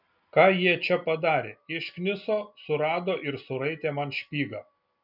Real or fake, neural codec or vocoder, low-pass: real; none; 5.4 kHz